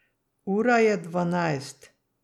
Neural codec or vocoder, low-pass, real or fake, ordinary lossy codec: none; 19.8 kHz; real; none